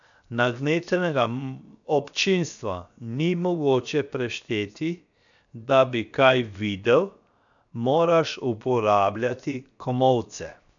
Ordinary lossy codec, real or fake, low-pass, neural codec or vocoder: none; fake; 7.2 kHz; codec, 16 kHz, 0.7 kbps, FocalCodec